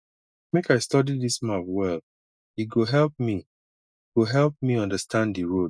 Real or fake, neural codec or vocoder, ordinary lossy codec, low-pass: real; none; none; none